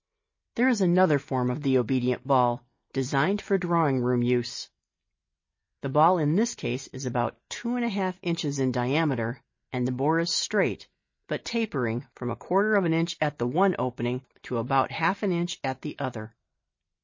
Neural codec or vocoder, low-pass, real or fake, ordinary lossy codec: none; 7.2 kHz; real; MP3, 32 kbps